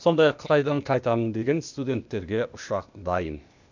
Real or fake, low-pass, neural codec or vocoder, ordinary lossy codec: fake; 7.2 kHz; codec, 16 kHz, 0.8 kbps, ZipCodec; none